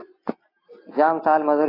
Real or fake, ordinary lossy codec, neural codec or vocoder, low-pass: real; AAC, 24 kbps; none; 5.4 kHz